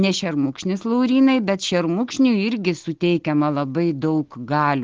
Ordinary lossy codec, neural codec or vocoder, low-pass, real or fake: Opus, 16 kbps; none; 7.2 kHz; real